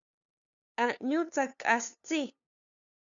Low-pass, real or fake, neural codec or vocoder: 7.2 kHz; fake; codec, 16 kHz, 2 kbps, FunCodec, trained on LibriTTS, 25 frames a second